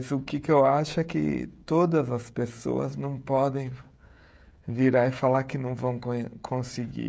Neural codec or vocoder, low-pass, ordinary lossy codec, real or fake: codec, 16 kHz, 16 kbps, FreqCodec, smaller model; none; none; fake